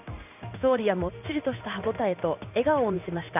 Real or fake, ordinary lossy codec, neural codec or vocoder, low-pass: fake; none; codec, 16 kHz in and 24 kHz out, 1 kbps, XY-Tokenizer; 3.6 kHz